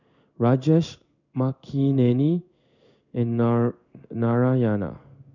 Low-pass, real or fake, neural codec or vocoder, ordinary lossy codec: 7.2 kHz; fake; codec, 16 kHz in and 24 kHz out, 1 kbps, XY-Tokenizer; none